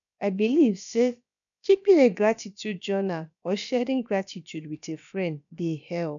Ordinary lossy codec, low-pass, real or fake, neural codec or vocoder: none; 7.2 kHz; fake; codec, 16 kHz, about 1 kbps, DyCAST, with the encoder's durations